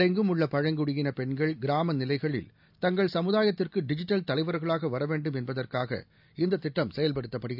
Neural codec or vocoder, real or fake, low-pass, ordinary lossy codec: none; real; 5.4 kHz; none